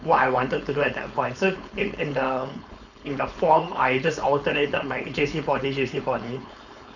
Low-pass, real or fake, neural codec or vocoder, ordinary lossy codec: 7.2 kHz; fake; codec, 16 kHz, 4.8 kbps, FACodec; none